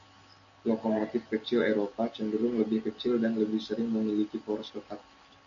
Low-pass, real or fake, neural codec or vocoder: 7.2 kHz; real; none